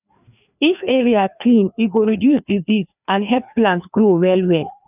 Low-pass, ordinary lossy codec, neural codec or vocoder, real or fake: 3.6 kHz; none; codec, 16 kHz, 2 kbps, FreqCodec, larger model; fake